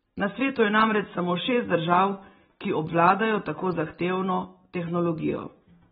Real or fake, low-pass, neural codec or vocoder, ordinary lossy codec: real; 19.8 kHz; none; AAC, 16 kbps